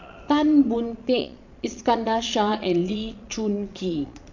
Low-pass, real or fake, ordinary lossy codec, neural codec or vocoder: 7.2 kHz; fake; none; vocoder, 22.05 kHz, 80 mel bands, Vocos